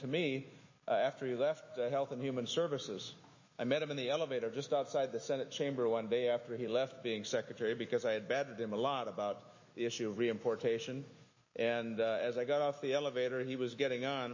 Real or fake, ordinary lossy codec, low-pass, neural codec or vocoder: fake; MP3, 32 kbps; 7.2 kHz; autoencoder, 48 kHz, 128 numbers a frame, DAC-VAE, trained on Japanese speech